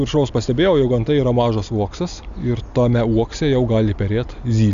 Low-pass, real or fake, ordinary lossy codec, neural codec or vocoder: 7.2 kHz; real; Opus, 64 kbps; none